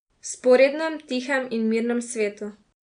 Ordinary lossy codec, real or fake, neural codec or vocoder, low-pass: none; real; none; 9.9 kHz